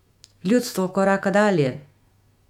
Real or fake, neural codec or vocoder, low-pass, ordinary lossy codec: fake; autoencoder, 48 kHz, 128 numbers a frame, DAC-VAE, trained on Japanese speech; 19.8 kHz; MP3, 96 kbps